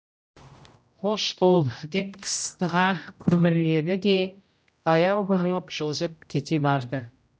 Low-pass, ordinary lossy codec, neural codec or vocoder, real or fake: none; none; codec, 16 kHz, 0.5 kbps, X-Codec, HuBERT features, trained on general audio; fake